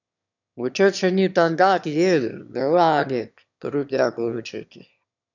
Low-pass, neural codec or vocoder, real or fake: 7.2 kHz; autoencoder, 22.05 kHz, a latent of 192 numbers a frame, VITS, trained on one speaker; fake